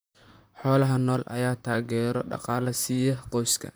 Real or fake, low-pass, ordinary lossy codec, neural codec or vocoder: fake; none; none; vocoder, 44.1 kHz, 128 mel bands, Pupu-Vocoder